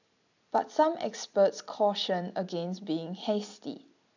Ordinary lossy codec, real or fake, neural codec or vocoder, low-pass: none; real; none; 7.2 kHz